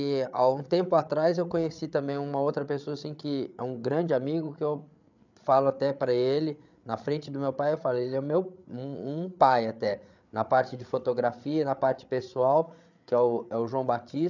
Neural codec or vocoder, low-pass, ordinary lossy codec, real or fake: codec, 16 kHz, 8 kbps, FreqCodec, larger model; 7.2 kHz; none; fake